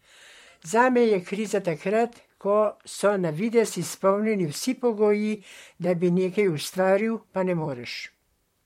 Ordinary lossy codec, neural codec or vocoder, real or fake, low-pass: MP3, 64 kbps; vocoder, 44.1 kHz, 128 mel bands, Pupu-Vocoder; fake; 19.8 kHz